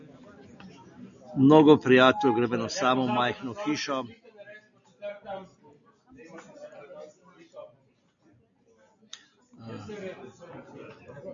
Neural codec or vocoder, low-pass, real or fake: none; 7.2 kHz; real